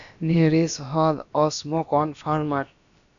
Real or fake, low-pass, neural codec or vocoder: fake; 7.2 kHz; codec, 16 kHz, about 1 kbps, DyCAST, with the encoder's durations